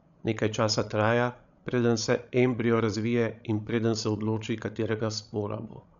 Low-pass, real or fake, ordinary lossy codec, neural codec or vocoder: 7.2 kHz; fake; none; codec, 16 kHz, 16 kbps, FreqCodec, larger model